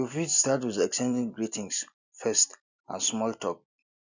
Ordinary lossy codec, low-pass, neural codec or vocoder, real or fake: none; 7.2 kHz; none; real